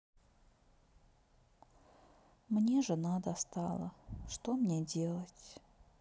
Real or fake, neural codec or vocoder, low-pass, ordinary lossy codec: real; none; none; none